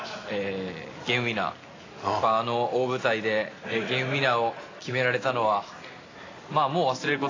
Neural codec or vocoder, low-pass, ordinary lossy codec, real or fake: none; 7.2 kHz; AAC, 32 kbps; real